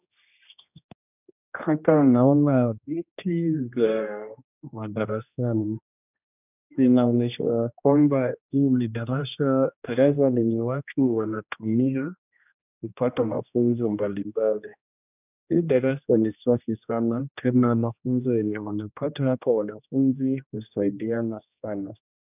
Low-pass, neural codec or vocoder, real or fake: 3.6 kHz; codec, 16 kHz, 1 kbps, X-Codec, HuBERT features, trained on general audio; fake